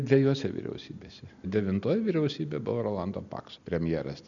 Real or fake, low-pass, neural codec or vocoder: real; 7.2 kHz; none